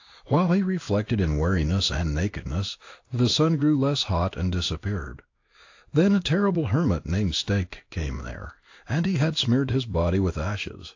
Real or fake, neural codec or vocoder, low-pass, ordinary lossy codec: fake; codec, 16 kHz in and 24 kHz out, 1 kbps, XY-Tokenizer; 7.2 kHz; AAC, 48 kbps